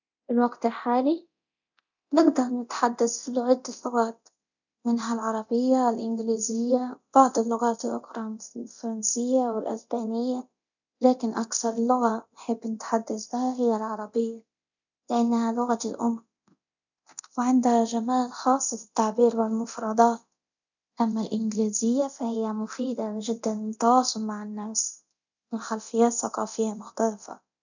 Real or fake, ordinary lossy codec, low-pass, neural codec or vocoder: fake; none; 7.2 kHz; codec, 24 kHz, 0.9 kbps, DualCodec